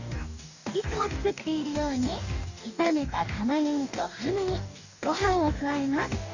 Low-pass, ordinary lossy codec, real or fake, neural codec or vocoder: 7.2 kHz; none; fake; codec, 44.1 kHz, 2.6 kbps, DAC